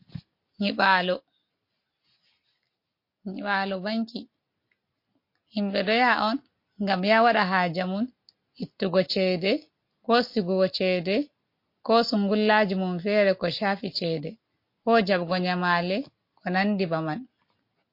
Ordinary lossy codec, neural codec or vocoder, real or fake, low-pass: MP3, 32 kbps; none; real; 5.4 kHz